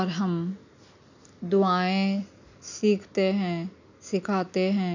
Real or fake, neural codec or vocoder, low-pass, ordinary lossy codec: real; none; 7.2 kHz; none